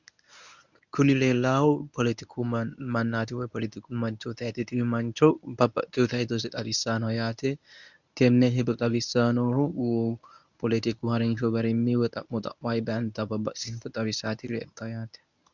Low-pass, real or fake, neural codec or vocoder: 7.2 kHz; fake; codec, 24 kHz, 0.9 kbps, WavTokenizer, medium speech release version 1